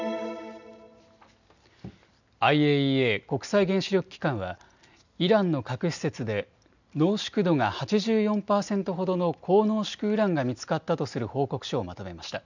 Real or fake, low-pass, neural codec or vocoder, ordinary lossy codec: real; 7.2 kHz; none; none